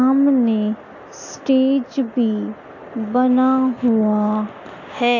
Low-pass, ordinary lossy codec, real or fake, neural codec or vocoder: 7.2 kHz; AAC, 48 kbps; real; none